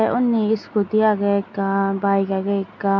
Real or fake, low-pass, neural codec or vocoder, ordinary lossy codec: real; 7.2 kHz; none; MP3, 48 kbps